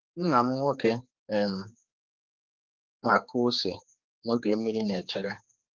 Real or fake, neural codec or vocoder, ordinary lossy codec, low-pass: fake; codec, 16 kHz, 2 kbps, X-Codec, HuBERT features, trained on general audio; Opus, 32 kbps; 7.2 kHz